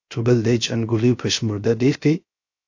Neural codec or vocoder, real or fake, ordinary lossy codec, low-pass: codec, 16 kHz, 0.3 kbps, FocalCodec; fake; AAC, 48 kbps; 7.2 kHz